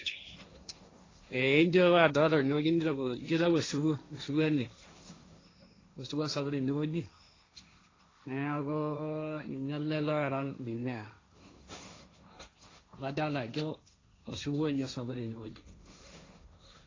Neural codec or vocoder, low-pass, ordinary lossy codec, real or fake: codec, 16 kHz, 1.1 kbps, Voila-Tokenizer; 7.2 kHz; AAC, 32 kbps; fake